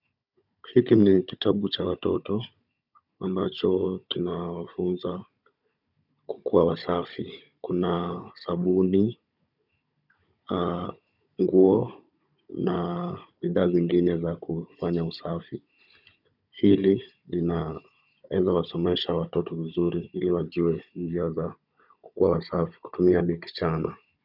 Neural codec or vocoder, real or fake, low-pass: codec, 16 kHz, 16 kbps, FunCodec, trained on Chinese and English, 50 frames a second; fake; 5.4 kHz